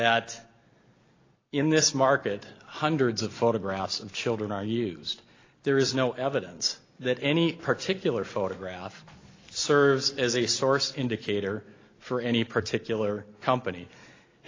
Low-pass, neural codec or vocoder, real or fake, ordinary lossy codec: 7.2 kHz; none; real; AAC, 32 kbps